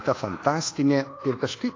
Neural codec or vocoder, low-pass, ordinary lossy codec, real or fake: codec, 24 kHz, 1.2 kbps, DualCodec; 7.2 kHz; AAC, 32 kbps; fake